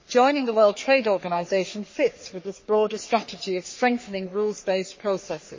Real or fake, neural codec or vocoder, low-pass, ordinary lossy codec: fake; codec, 44.1 kHz, 3.4 kbps, Pupu-Codec; 7.2 kHz; MP3, 32 kbps